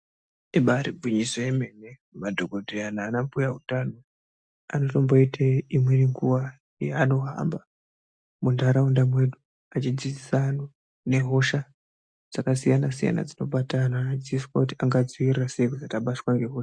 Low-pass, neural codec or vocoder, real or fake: 9.9 kHz; none; real